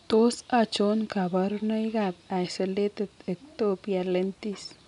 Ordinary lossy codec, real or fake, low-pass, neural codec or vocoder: MP3, 96 kbps; real; 10.8 kHz; none